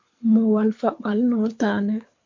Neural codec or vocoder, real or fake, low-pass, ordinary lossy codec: codec, 24 kHz, 6 kbps, HILCodec; fake; 7.2 kHz; MP3, 48 kbps